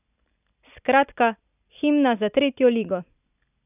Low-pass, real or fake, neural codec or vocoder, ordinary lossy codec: 3.6 kHz; real; none; none